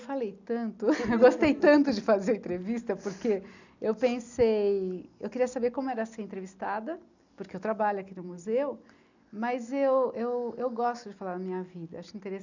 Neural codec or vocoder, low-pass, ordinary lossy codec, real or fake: none; 7.2 kHz; none; real